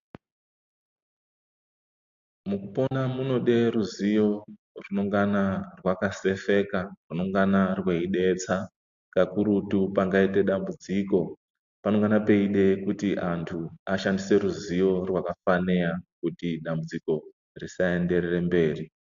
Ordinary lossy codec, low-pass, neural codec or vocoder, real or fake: AAC, 64 kbps; 7.2 kHz; none; real